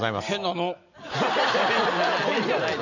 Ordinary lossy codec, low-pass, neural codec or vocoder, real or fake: none; 7.2 kHz; none; real